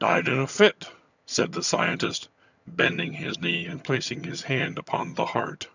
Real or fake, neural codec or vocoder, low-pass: fake; vocoder, 22.05 kHz, 80 mel bands, HiFi-GAN; 7.2 kHz